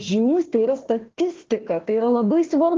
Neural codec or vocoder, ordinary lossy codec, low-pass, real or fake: codec, 16 kHz, 1 kbps, FunCodec, trained on Chinese and English, 50 frames a second; Opus, 16 kbps; 7.2 kHz; fake